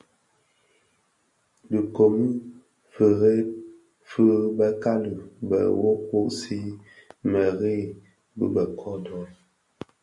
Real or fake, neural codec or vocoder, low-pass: real; none; 10.8 kHz